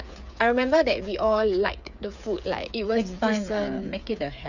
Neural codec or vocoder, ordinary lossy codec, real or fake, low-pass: codec, 16 kHz, 8 kbps, FreqCodec, smaller model; none; fake; 7.2 kHz